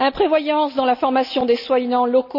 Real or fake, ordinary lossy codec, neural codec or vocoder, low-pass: real; none; none; 5.4 kHz